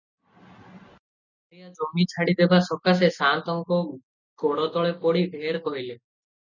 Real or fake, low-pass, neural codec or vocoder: real; 7.2 kHz; none